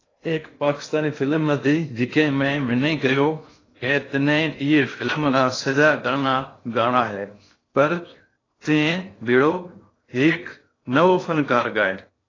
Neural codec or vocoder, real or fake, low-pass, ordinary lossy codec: codec, 16 kHz in and 24 kHz out, 0.6 kbps, FocalCodec, streaming, 2048 codes; fake; 7.2 kHz; AAC, 32 kbps